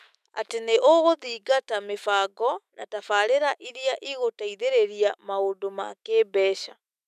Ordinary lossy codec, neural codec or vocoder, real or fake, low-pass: none; autoencoder, 48 kHz, 128 numbers a frame, DAC-VAE, trained on Japanese speech; fake; 14.4 kHz